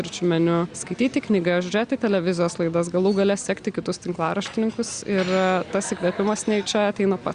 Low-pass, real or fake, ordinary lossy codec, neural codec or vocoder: 9.9 kHz; real; Opus, 64 kbps; none